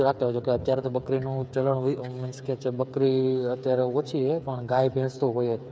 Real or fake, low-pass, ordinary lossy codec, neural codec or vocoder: fake; none; none; codec, 16 kHz, 8 kbps, FreqCodec, smaller model